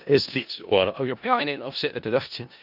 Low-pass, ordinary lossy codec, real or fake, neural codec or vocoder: 5.4 kHz; none; fake; codec, 16 kHz in and 24 kHz out, 0.4 kbps, LongCat-Audio-Codec, four codebook decoder